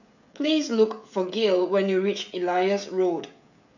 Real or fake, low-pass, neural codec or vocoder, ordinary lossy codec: fake; 7.2 kHz; codec, 16 kHz, 16 kbps, FreqCodec, smaller model; none